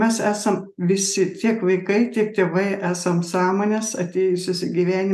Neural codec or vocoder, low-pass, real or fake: none; 14.4 kHz; real